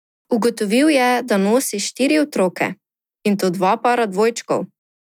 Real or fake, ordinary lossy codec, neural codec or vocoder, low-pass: real; none; none; 19.8 kHz